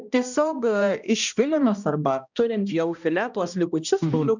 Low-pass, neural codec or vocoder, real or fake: 7.2 kHz; codec, 16 kHz, 1 kbps, X-Codec, HuBERT features, trained on balanced general audio; fake